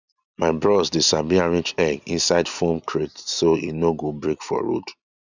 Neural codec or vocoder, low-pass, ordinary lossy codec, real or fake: none; 7.2 kHz; none; real